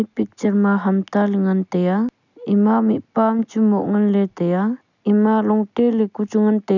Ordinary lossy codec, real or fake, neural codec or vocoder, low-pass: none; real; none; 7.2 kHz